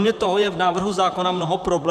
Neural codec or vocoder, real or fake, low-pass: vocoder, 44.1 kHz, 128 mel bands, Pupu-Vocoder; fake; 14.4 kHz